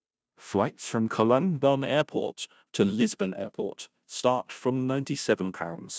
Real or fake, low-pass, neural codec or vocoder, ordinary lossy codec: fake; none; codec, 16 kHz, 0.5 kbps, FunCodec, trained on Chinese and English, 25 frames a second; none